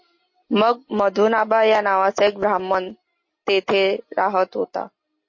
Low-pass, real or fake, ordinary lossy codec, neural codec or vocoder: 7.2 kHz; real; MP3, 32 kbps; none